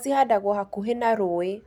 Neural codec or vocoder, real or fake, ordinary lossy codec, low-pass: none; real; none; 19.8 kHz